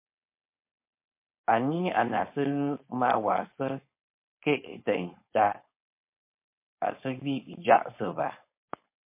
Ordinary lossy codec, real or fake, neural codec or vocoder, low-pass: MP3, 24 kbps; fake; codec, 16 kHz, 4.8 kbps, FACodec; 3.6 kHz